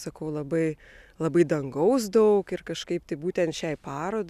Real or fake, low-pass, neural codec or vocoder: real; 14.4 kHz; none